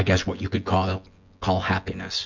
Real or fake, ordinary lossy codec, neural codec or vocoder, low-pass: fake; MP3, 64 kbps; vocoder, 24 kHz, 100 mel bands, Vocos; 7.2 kHz